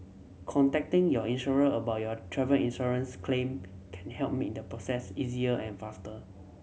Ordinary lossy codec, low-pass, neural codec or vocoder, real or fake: none; none; none; real